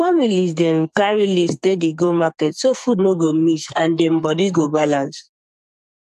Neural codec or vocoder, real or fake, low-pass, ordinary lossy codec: codec, 44.1 kHz, 2.6 kbps, SNAC; fake; 14.4 kHz; AAC, 96 kbps